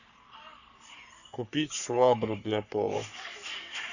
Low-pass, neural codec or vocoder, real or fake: 7.2 kHz; codec, 44.1 kHz, 3.4 kbps, Pupu-Codec; fake